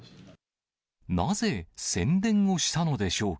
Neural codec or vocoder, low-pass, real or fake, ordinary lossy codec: none; none; real; none